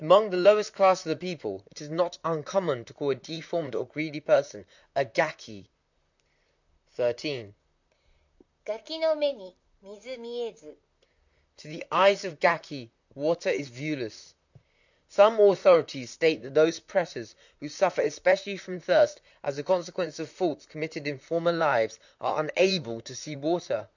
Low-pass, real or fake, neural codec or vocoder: 7.2 kHz; fake; vocoder, 44.1 kHz, 128 mel bands, Pupu-Vocoder